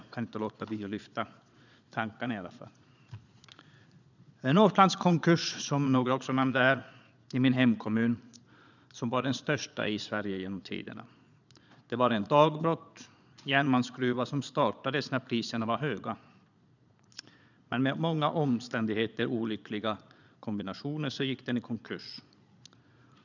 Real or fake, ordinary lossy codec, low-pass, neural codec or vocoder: fake; none; 7.2 kHz; vocoder, 22.05 kHz, 80 mel bands, Vocos